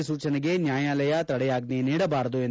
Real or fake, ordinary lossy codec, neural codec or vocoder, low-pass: real; none; none; none